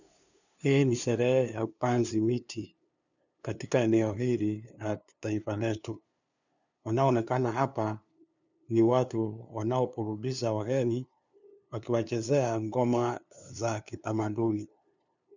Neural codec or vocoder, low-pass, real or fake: codec, 16 kHz, 2 kbps, FunCodec, trained on LibriTTS, 25 frames a second; 7.2 kHz; fake